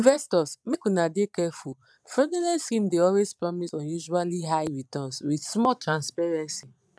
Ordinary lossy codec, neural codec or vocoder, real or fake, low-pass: none; vocoder, 22.05 kHz, 80 mel bands, Vocos; fake; none